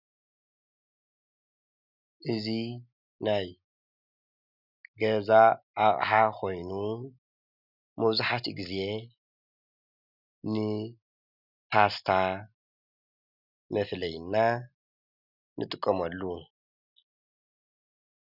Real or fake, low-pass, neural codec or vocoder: real; 5.4 kHz; none